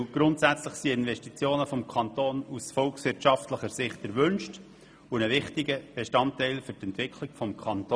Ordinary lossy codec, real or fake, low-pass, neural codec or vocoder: none; real; none; none